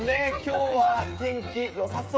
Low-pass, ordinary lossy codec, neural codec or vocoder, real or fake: none; none; codec, 16 kHz, 8 kbps, FreqCodec, smaller model; fake